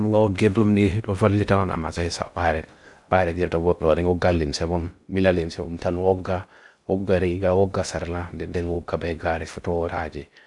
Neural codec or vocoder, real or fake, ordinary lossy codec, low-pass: codec, 16 kHz in and 24 kHz out, 0.6 kbps, FocalCodec, streaming, 2048 codes; fake; none; 10.8 kHz